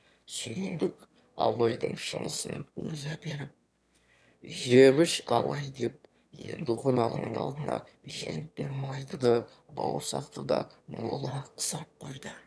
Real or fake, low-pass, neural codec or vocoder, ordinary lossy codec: fake; none; autoencoder, 22.05 kHz, a latent of 192 numbers a frame, VITS, trained on one speaker; none